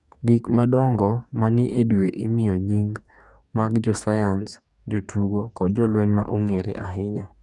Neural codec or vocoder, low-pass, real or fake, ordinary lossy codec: codec, 44.1 kHz, 2.6 kbps, DAC; 10.8 kHz; fake; none